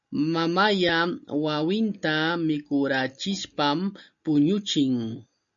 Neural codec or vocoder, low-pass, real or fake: none; 7.2 kHz; real